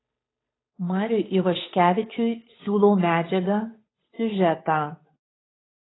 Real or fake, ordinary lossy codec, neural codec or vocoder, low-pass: fake; AAC, 16 kbps; codec, 16 kHz, 8 kbps, FunCodec, trained on Chinese and English, 25 frames a second; 7.2 kHz